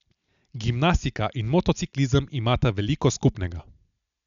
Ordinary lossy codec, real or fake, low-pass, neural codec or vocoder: MP3, 96 kbps; real; 7.2 kHz; none